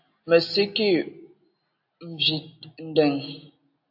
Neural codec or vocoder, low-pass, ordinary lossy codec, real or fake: none; 5.4 kHz; AAC, 48 kbps; real